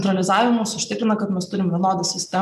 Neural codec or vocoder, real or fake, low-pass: none; real; 14.4 kHz